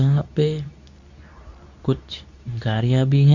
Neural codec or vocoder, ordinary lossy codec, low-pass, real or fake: codec, 24 kHz, 0.9 kbps, WavTokenizer, medium speech release version 2; none; 7.2 kHz; fake